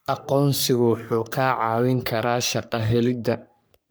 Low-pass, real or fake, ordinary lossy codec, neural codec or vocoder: none; fake; none; codec, 44.1 kHz, 3.4 kbps, Pupu-Codec